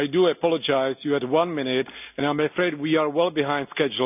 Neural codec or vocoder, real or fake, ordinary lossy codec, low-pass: none; real; none; 3.6 kHz